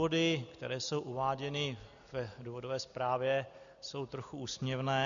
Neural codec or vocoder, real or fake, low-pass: none; real; 7.2 kHz